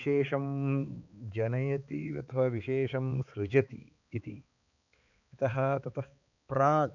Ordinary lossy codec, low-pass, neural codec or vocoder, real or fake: none; 7.2 kHz; codec, 16 kHz, 4 kbps, X-Codec, HuBERT features, trained on balanced general audio; fake